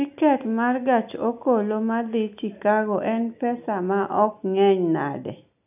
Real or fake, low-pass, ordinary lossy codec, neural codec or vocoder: real; 3.6 kHz; none; none